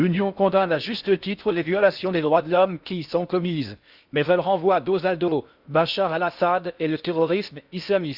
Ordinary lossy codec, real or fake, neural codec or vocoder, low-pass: Opus, 64 kbps; fake; codec, 16 kHz in and 24 kHz out, 0.6 kbps, FocalCodec, streaming, 4096 codes; 5.4 kHz